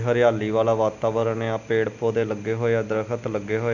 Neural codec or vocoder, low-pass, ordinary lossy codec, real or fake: none; 7.2 kHz; none; real